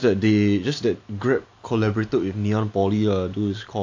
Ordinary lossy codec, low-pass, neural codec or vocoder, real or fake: AAC, 48 kbps; 7.2 kHz; none; real